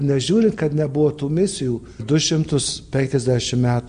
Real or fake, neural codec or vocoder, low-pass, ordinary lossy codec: real; none; 9.9 kHz; MP3, 64 kbps